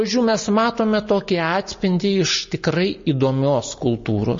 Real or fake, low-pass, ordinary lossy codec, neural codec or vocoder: real; 7.2 kHz; MP3, 32 kbps; none